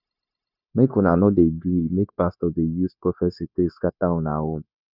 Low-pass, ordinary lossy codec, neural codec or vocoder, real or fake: 5.4 kHz; none; codec, 16 kHz, 0.9 kbps, LongCat-Audio-Codec; fake